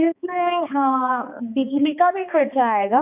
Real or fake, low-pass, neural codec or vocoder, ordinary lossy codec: fake; 3.6 kHz; codec, 16 kHz, 2 kbps, X-Codec, HuBERT features, trained on balanced general audio; none